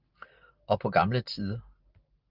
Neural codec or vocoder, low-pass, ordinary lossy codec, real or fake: none; 5.4 kHz; Opus, 24 kbps; real